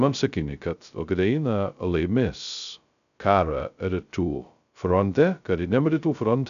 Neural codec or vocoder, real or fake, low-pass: codec, 16 kHz, 0.2 kbps, FocalCodec; fake; 7.2 kHz